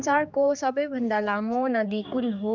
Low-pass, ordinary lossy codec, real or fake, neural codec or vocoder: none; none; fake; codec, 16 kHz, 4 kbps, X-Codec, HuBERT features, trained on general audio